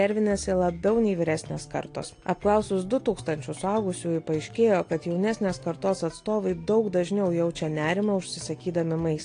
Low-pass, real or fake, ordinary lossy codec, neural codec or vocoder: 9.9 kHz; real; AAC, 48 kbps; none